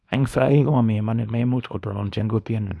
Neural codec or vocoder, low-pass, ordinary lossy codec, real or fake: codec, 24 kHz, 0.9 kbps, WavTokenizer, small release; none; none; fake